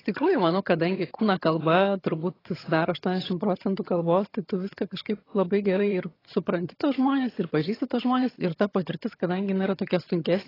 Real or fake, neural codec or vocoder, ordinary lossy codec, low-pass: fake; vocoder, 22.05 kHz, 80 mel bands, HiFi-GAN; AAC, 24 kbps; 5.4 kHz